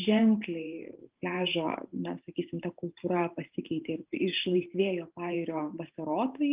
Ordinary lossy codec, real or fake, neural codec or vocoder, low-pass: Opus, 24 kbps; fake; vocoder, 44.1 kHz, 128 mel bands every 512 samples, BigVGAN v2; 3.6 kHz